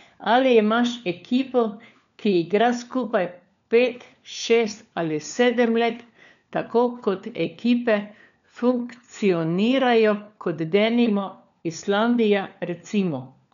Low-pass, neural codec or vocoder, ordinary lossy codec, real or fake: 7.2 kHz; codec, 16 kHz, 4 kbps, FunCodec, trained on LibriTTS, 50 frames a second; none; fake